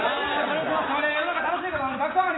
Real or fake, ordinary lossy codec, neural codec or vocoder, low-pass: real; AAC, 16 kbps; none; 7.2 kHz